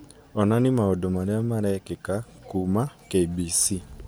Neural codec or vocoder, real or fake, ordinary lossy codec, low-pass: none; real; none; none